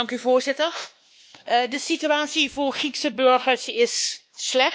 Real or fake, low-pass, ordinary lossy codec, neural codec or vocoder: fake; none; none; codec, 16 kHz, 2 kbps, X-Codec, WavLM features, trained on Multilingual LibriSpeech